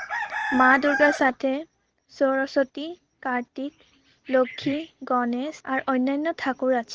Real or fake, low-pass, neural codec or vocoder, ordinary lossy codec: real; 7.2 kHz; none; Opus, 16 kbps